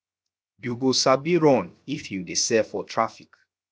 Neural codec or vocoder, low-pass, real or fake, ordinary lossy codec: codec, 16 kHz, 0.7 kbps, FocalCodec; none; fake; none